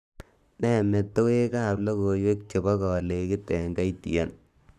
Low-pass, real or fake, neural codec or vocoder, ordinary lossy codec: 14.4 kHz; fake; codec, 44.1 kHz, 7.8 kbps, Pupu-Codec; none